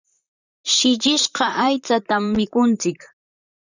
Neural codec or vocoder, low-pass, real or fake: vocoder, 44.1 kHz, 128 mel bands, Pupu-Vocoder; 7.2 kHz; fake